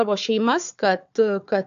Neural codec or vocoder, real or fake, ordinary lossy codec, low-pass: codec, 16 kHz, 4 kbps, FunCodec, trained on Chinese and English, 50 frames a second; fake; AAC, 64 kbps; 7.2 kHz